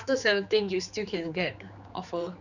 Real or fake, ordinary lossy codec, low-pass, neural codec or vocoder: fake; none; 7.2 kHz; codec, 16 kHz, 4 kbps, X-Codec, HuBERT features, trained on general audio